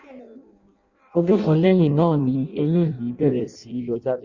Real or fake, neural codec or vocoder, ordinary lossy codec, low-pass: fake; codec, 16 kHz in and 24 kHz out, 0.6 kbps, FireRedTTS-2 codec; Opus, 64 kbps; 7.2 kHz